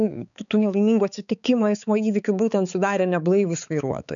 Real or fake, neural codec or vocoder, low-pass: fake; codec, 16 kHz, 4 kbps, X-Codec, HuBERT features, trained on balanced general audio; 7.2 kHz